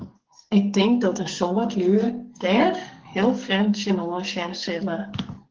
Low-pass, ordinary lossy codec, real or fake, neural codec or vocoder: 7.2 kHz; Opus, 16 kbps; fake; codec, 44.1 kHz, 2.6 kbps, SNAC